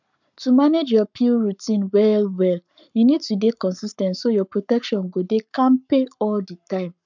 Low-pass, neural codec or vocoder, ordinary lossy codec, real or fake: 7.2 kHz; codec, 44.1 kHz, 7.8 kbps, Pupu-Codec; none; fake